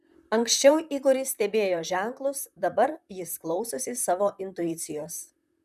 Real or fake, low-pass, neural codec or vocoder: fake; 14.4 kHz; vocoder, 44.1 kHz, 128 mel bands, Pupu-Vocoder